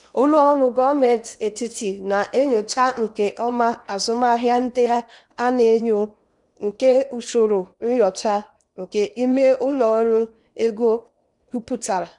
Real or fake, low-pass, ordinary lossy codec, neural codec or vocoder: fake; 10.8 kHz; none; codec, 16 kHz in and 24 kHz out, 0.8 kbps, FocalCodec, streaming, 65536 codes